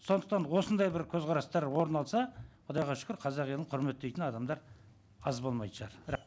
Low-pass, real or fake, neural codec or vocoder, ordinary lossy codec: none; real; none; none